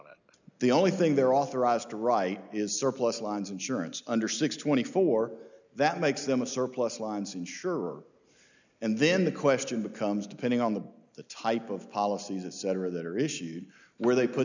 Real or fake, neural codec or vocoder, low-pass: real; none; 7.2 kHz